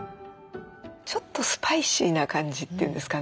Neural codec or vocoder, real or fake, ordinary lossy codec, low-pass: none; real; none; none